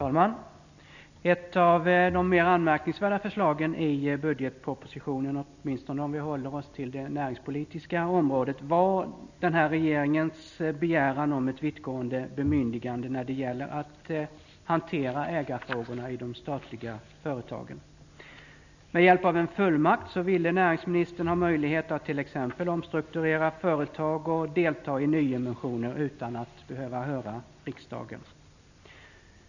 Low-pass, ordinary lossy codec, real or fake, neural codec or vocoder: 7.2 kHz; none; real; none